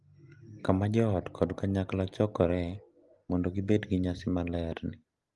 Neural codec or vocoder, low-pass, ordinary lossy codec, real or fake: none; 10.8 kHz; Opus, 24 kbps; real